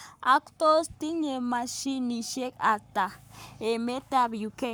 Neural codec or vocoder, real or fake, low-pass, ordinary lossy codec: codec, 44.1 kHz, 7.8 kbps, Pupu-Codec; fake; none; none